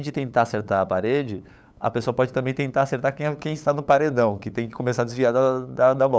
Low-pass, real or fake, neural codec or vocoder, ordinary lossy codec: none; fake; codec, 16 kHz, 8 kbps, FunCodec, trained on LibriTTS, 25 frames a second; none